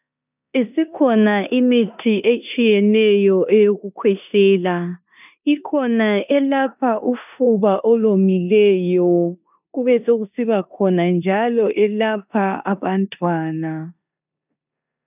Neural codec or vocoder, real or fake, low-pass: codec, 16 kHz in and 24 kHz out, 0.9 kbps, LongCat-Audio-Codec, four codebook decoder; fake; 3.6 kHz